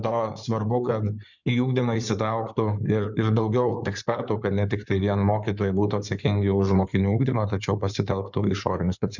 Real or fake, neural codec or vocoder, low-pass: fake; codec, 16 kHz in and 24 kHz out, 2.2 kbps, FireRedTTS-2 codec; 7.2 kHz